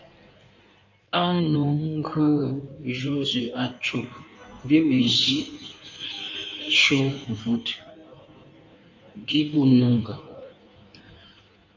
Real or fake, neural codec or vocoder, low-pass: fake; codec, 16 kHz in and 24 kHz out, 1.1 kbps, FireRedTTS-2 codec; 7.2 kHz